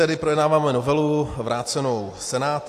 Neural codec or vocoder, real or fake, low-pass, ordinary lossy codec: none; real; 14.4 kHz; AAC, 64 kbps